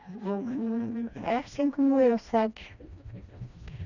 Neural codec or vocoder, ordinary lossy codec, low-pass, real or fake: codec, 16 kHz, 1 kbps, FreqCodec, smaller model; none; 7.2 kHz; fake